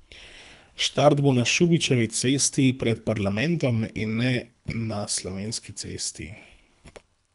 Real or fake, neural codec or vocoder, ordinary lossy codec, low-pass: fake; codec, 24 kHz, 3 kbps, HILCodec; none; 10.8 kHz